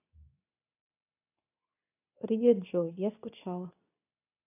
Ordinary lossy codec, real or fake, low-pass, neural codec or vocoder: none; fake; 3.6 kHz; codec, 24 kHz, 0.9 kbps, WavTokenizer, medium speech release version 2